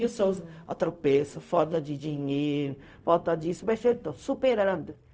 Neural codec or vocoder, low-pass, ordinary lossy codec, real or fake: codec, 16 kHz, 0.4 kbps, LongCat-Audio-Codec; none; none; fake